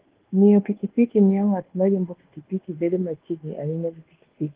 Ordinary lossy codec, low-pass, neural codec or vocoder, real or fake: Opus, 16 kbps; 3.6 kHz; codec, 24 kHz, 1.2 kbps, DualCodec; fake